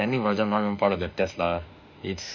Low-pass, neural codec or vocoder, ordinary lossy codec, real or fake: 7.2 kHz; autoencoder, 48 kHz, 32 numbers a frame, DAC-VAE, trained on Japanese speech; none; fake